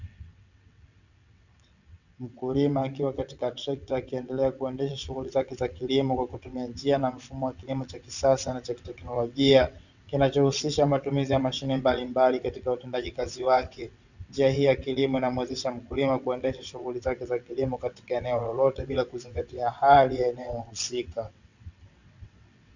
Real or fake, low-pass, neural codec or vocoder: fake; 7.2 kHz; vocoder, 22.05 kHz, 80 mel bands, WaveNeXt